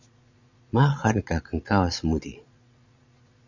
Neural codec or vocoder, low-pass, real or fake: vocoder, 22.05 kHz, 80 mel bands, Vocos; 7.2 kHz; fake